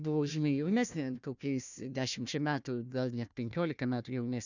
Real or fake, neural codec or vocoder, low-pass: fake; codec, 16 kHz, 1 kbps, FunCodec, trained on Chinese and English, 50 frames a second; 7.2 kHz